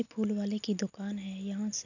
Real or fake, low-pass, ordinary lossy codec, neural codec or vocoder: real; 7.2 kHz; none; none